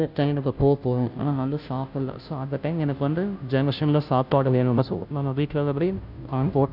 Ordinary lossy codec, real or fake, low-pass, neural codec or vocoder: none; fake; 5.4 kHz; codec, 16 kHz, 0.5 kbps, FunCodec, trained on Chinese and English, 25 frames a second